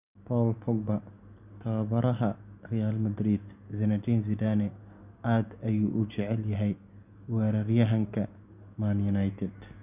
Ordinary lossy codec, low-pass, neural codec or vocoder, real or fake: none; 3.6 kHz; none; real